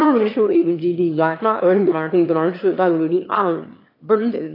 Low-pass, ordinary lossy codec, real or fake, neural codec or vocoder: 5.4 kHz; AAC, 32 kbps; fake; autoencoder, 22.05 kHz, a latent of 192 numbers a frame, VITS, trained on one speaker